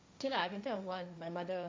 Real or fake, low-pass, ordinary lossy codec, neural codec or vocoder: fake; none; none; codec, 16 kHz, 1.1 kbps, Voila-Tokenizer